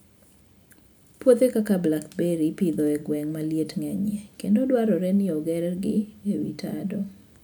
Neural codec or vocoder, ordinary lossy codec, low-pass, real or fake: none; none; none; real